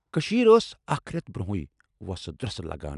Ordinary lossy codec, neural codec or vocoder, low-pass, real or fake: none; none; 10.8 kHz; real